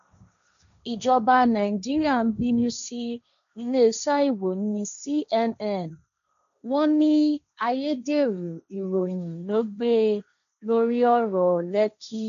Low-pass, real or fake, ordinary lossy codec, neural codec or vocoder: 7.2 kHz; fake; none; codec, 16 kHz, 1.1 kbps, Voila-Tokenizer